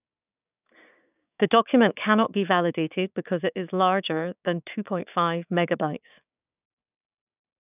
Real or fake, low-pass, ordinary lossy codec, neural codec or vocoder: fake; 3.6 kHz; none; codec, 16 kHz, 6 kbps, DAC